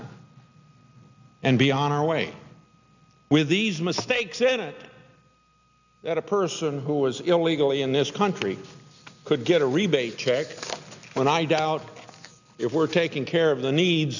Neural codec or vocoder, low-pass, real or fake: none; 7.2 kHz; real